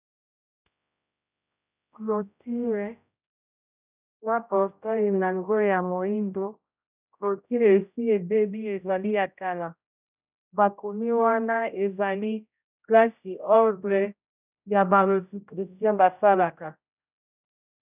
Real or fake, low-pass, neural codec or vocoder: fake; 3.6 kHz; codec, 16 kHz, 0.5 kbps, X-Codec, HuBERT features, trained on general audio